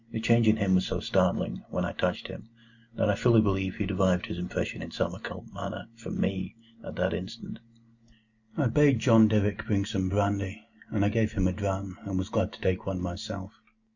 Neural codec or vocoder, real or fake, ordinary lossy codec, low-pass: none; real; Opus, 64 kbps; 7.2 kHz